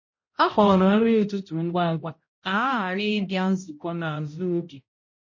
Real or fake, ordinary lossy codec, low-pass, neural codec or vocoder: fake; MP3, 32 kbps; 7.2 kHz; codec, 16 kHz, 0.5 kbps, X-Codec, HuBERT features, trained on balanced general audio